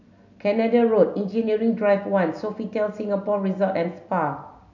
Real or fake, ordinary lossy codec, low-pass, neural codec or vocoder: real; none; 7.2 kHz; none